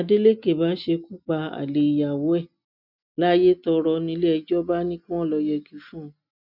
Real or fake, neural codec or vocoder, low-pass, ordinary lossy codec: real; none; 5.4 kHz; none